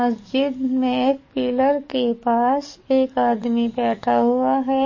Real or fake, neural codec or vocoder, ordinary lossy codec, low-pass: fake; codec, 44.1 kHz, 7.8 kbps, DAC; MP3, 32 kbps; 7.2 kHz